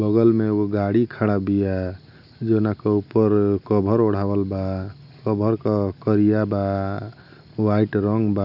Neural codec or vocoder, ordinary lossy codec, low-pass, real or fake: none; none; 5.4 kHz; real